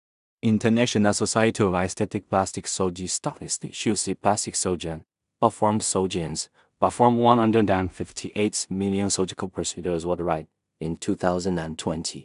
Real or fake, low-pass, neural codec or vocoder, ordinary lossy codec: fake; 10.8 kHz; codec, 16 kHz in and 24 kHz out, 0.4 kbps, LongCat-Audio-Codec, two codebook decoder; none